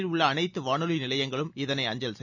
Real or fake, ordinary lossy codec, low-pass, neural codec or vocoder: real; none; 7.2 kHz; none